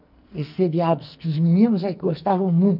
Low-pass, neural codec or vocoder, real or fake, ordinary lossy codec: 5.4 kHz; codec, 44.1 kHz, 2.6 kbps, SNAC; fake; none